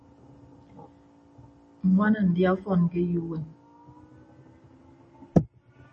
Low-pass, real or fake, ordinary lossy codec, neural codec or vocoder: 10.8 kHz; real; MP3, 32 kbps; none